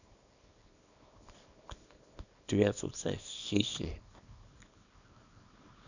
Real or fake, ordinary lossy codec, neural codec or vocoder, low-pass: fake; none; codec, 24 kHz, 0.9 kbps, WavTokenizer, small release; 7.2 kHz